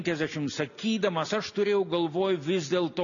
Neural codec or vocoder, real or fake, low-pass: none; real; 7.2 kHz